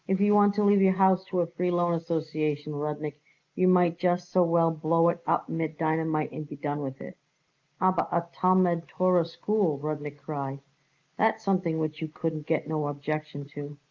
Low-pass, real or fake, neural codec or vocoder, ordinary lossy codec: 7.2 kHz; real; none; Opus, 24 kbps